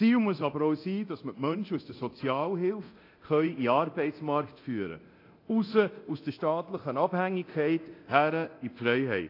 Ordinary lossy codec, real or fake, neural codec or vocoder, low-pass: AAC, 32 kbps; fake; codec, 24 kHz, 0.9 kbps, DualCodec; 5.4 kHz